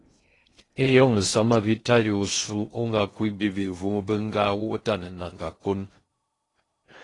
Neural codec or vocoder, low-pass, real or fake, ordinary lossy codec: codec, 16 kHz in and 24 kHz out, 0.6 kbps, FocalCodec, streaming, 2048 codes; 10.8 kHz; fake; AAC, 32 kbps